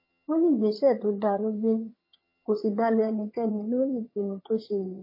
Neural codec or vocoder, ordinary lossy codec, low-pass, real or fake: vocoder, 22.05 kHz, 80 mel bands, HiFi-GAN; MP3, 24 kbps; 5.4 kHz; fake